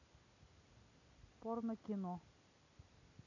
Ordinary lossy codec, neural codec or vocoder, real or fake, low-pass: none; none; real; 7.2 kHz